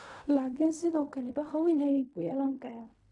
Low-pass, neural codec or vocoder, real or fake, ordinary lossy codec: 10.8 kHz; codec, 16 kHz in and 24 kHz out, 0.4 kbps, LongCat-Audio-Codec, fine tuned four codebook decoder; fake; none